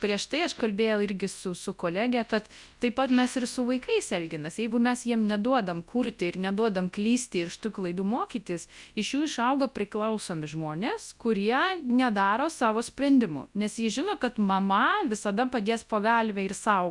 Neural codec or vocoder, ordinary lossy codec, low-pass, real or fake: codec, 24 kHz, 0.9 kbps, WavTokenizer, large speech release; Opus, 64 kbps; 10.8 kHz; fake